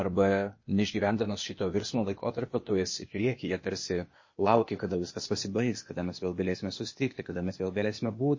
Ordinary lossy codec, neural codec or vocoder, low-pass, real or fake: MP3, 32 kbps; codec, 16 kHz, 0.8 kbps, ZipCodec; 7.2 kHz; fake